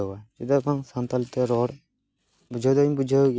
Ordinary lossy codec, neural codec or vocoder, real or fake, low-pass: none; none; real; none